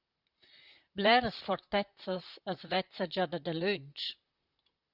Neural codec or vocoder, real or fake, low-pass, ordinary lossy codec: vocoder, 44.1 kHz, 128 mel bands, Pupu-Vocoder; fake; 5.4 kHz; Opus, 64 kbps